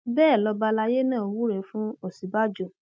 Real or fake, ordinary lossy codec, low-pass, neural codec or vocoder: real; none; none; none